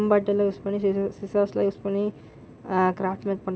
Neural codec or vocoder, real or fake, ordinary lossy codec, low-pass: none; real; none; none